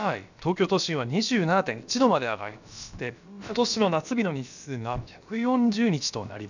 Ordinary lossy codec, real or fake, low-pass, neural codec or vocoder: none; fake; 7.2 kHz; codec, 16 kHz, about 1 kbps, DyCAST, with the encoder's durations